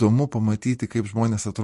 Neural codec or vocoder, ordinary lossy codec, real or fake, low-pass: none; MP3, 48 kbps; real; 14.4 kHz